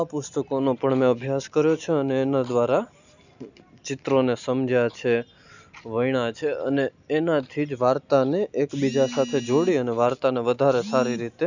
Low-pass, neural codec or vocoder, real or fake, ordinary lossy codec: 7.2 kHz; none; real; none